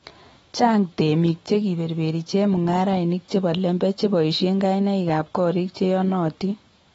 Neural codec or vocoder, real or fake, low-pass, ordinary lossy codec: autoencoder, 48 kHz, 128 numbers a frame, DAC-VAE, trained on Japanese speech; fake; 19.8 kHz; AAC, 24 kbps